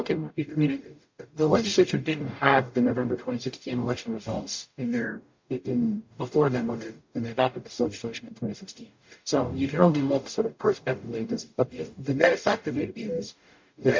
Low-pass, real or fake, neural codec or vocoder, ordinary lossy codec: 7.2 kHz; fake; codec, 44.1 kHz, 0.9 kbps, DAC; MP3, 48 kbps